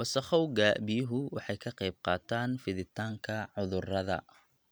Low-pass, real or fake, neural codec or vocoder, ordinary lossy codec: none; real; none; none